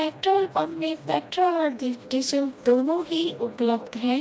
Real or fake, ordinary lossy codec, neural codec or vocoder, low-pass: fake; none; codec, 16 kHz, 1 kbps, FreqCodec, smaller model; none